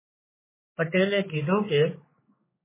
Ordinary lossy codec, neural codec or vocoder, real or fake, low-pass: MP3, 16 kbps; codec, 16 kHz, 4 kbps, X-Codec, HuBERT features, trained on general audio; fake; 3.6 kHz